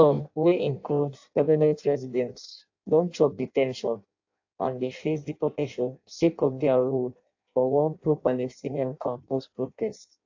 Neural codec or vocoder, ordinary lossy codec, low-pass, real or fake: codec, 16 kHz in and 24 kHz out, 0.6 kbps, FireRedTTS-2 codec; AAC, 48 kbps; 7.2 kHz; fake